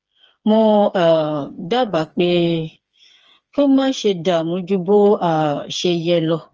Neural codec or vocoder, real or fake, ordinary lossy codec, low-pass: codec, 16 kHz, 4 kbps, FreqCodec, smaller model; fake; Opus, 24 kbps; 7.2 kHz